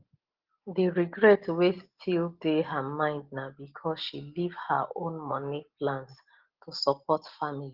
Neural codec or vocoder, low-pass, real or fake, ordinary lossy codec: none; 5.4 kHz; real; Opus, 16 kbps